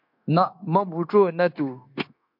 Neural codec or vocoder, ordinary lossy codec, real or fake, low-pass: codec, 16 kHz, 0.9 kbps, LongCat-Audio-Codec; MP3, 48 kbps; fake; 5.4 kHz